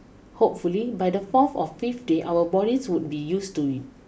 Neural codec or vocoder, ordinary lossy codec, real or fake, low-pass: none; none; real; none